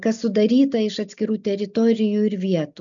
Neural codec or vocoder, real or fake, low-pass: none; real; 7.2 kHz